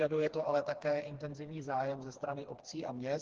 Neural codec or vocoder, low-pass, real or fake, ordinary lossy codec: codec, 16 kHz, 2 kbps, FreqCodec, smaller model; 7.2 kHz; fake; Opus, 16 kbps